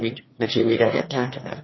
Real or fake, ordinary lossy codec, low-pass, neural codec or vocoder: fake; MP3, 24 kbps; 7.2 kHz; autoencoder, 22.05 kHz, a latent of 192 numbers a frame, VITS, trained on one speaker